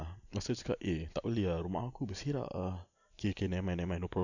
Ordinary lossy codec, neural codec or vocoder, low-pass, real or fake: none; none; 7.2 kHz; real